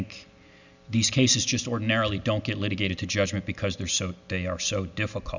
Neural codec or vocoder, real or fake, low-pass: none; real; 7.2 kHz